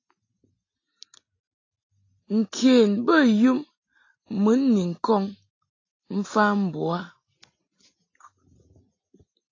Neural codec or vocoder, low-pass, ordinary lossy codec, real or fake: none; 7.2 kHz; AAC, 32 kbps; real